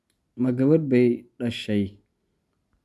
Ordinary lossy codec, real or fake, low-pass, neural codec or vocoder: none; real; none; none